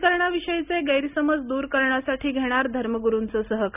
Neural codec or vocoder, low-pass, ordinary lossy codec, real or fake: none; 3.6 kHz; none; real